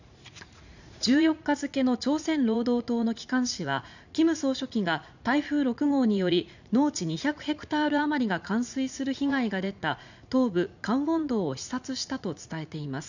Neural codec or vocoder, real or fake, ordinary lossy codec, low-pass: vocoder, 44.1 kHz, 80 mel bands, Vocos; fake; none; 7.2 kHz